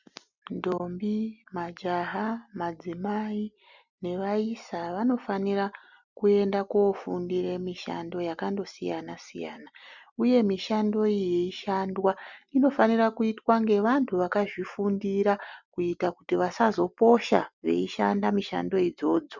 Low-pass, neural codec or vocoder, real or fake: 7.2 kHz; none; real